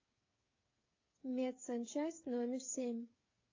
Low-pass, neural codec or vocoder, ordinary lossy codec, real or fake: 7.2 kHz; codec, 16 kHz, 8 kbps, FreqCodec, smaller model; AAC, 32 kbps; fake